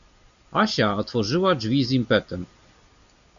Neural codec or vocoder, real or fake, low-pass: none; real; 7.2 kHz